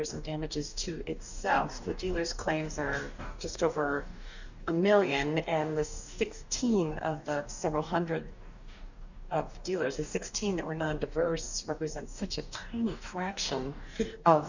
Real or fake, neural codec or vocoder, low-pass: fake; codec, 44.1 kHz, 2.6 kbps, DAC; 7.2 kHz